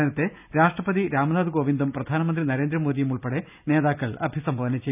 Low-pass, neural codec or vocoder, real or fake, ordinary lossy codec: 3.6 kHz; none; real; none